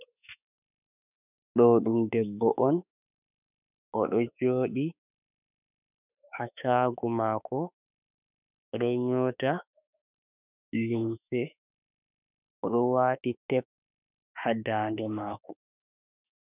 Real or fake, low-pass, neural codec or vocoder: fake; 3.6 kHz; autoencoder, 48 kHz, 32 numbers a frame, DAC-VAE, trained on Japanese speech